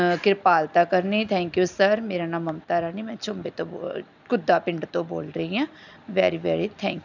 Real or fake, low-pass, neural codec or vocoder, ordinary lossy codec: real; 7.2 kHz; none; none